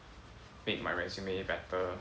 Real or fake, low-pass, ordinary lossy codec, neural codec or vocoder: real; none; none; none